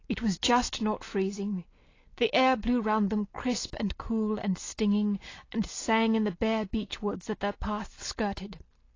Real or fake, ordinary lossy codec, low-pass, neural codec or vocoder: real; AAC, 32 kbps; 7.2 kHz; none